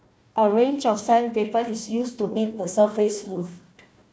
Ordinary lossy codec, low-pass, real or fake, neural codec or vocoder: none; none; fake; codec, 16 kHz, 1 kbps, FunCodec, trained on Chinese and English, 50 frames a second